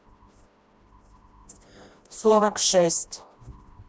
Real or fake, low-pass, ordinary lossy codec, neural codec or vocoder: fake; none; none; codec, 16 kHz, 1 kbps, FreqCodec, smaller model